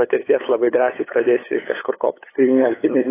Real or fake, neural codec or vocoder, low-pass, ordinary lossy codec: fake; codec, 16 kHz, 8 kbps, FunCodec, trained on LibriTTS, 25 frames a second; 3.6 kHz; AAC, 16 kbps